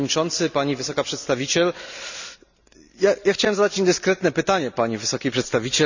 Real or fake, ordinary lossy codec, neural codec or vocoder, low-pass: real; none; none; 7.2 kHz